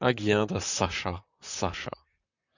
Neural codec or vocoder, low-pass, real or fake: vocoder, 44.1 kHz, 128 mel bands, Pupu-Vocoder; 7.2 kHz; fake